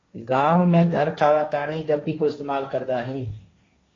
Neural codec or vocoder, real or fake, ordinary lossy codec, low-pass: codec, 16 kHz, 1.1 kbps, Voila-Tokenizer; fake; AAC, 32 kbps; 7.2 kHz